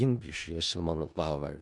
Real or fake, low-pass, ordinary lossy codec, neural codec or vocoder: fake; 10.8 kHz; Opus, 64 kbps; codec, 16 kHz in and 24 kHz out, 0.4 kbps, LongCat-Audio-Codec, four codebook decoder